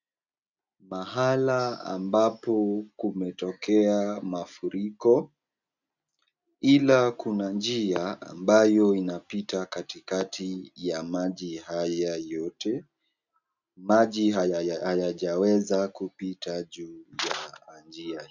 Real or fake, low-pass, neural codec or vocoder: real; 7.2 kHz; none